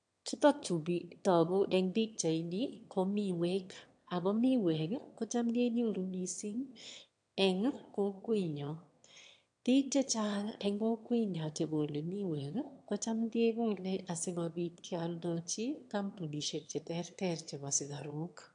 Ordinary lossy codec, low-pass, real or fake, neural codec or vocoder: AAC, 64 kbps; 9.9 kHz; fake; autoencoder, 22.05 kHz, a latent of 192 numbers a frame, VITS, trained on one speaker